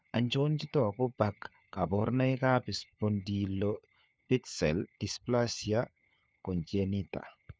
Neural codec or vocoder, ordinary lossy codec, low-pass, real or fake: codec, 16 kHz, 4 kbps, FunCodec, trained on LibriTTS, 50 frames a second; none; none; fake